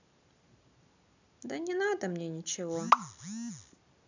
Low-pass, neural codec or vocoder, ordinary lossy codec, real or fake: 7.2 kHz; none; none; real